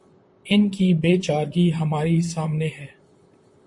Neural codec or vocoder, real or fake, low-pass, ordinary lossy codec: vocoder, 44.1 kHz, 128 mel bands, Pupu-Vocoder; fake; 10.8 kHz; MP3, 48 kbps